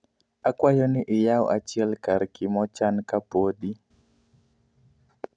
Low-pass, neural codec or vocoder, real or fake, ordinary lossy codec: 9.9 kHz; none; real; none